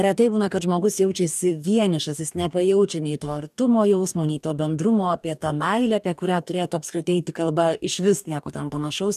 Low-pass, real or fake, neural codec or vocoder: 14.4 kHz; fake; codec, 44.1 kHz, 2.6 kbps, DAC